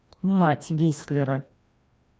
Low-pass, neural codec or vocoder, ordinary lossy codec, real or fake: none; codec, 16 kHz, 1 kbps, FreqCodec, larger model; none; fake